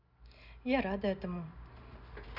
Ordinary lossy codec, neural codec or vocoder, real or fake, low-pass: none; none; real; 5.4 kHz